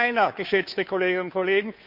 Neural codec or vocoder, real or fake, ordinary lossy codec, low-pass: codec, 16 kHz, 2 kbps, FunCodec, trained on Chinese and English, 25 frames a second; fake; MP3, 48 kbps; 5.4 kHz